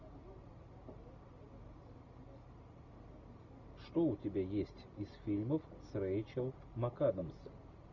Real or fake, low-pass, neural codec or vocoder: real; 7.2 kHz; none